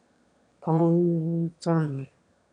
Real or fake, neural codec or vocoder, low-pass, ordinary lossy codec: fake; autoencoder, 22.05 kHz, a latent of 192 numbers a frame, VITS, trained on one speaker; 9.9 kHz; none